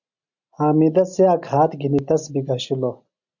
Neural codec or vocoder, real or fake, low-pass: none; real; 7.2 kHz